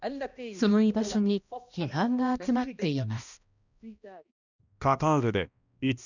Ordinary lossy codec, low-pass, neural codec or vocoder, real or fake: none; 7.2 kHz; codec, 16 kHz, 1 kbps, X-Codec, HuBERT features, trained on balanced general audio; fake